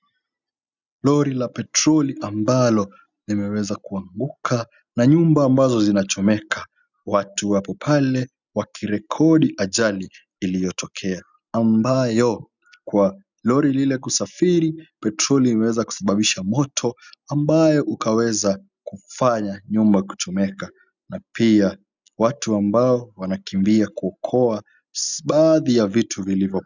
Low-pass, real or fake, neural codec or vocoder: 7.2 kHz; real; none